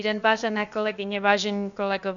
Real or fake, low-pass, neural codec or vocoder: fake; 7.2 kHz; codec, 16 kHz, about 1 kbps, DyCAST, with the encoder's durations